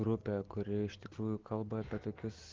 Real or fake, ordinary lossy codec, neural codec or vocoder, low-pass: real; Opus, 16 kbps; none; 7.2 kHz